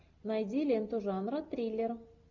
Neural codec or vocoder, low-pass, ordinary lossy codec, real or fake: none; 7.2 kHz; MP3, 64 kbps; real